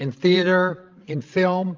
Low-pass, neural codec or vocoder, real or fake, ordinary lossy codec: 7.2 kHz; codec, 16 kHz, 16 kbps, FreqCodec, larger model; fake; Opus, 32 kbps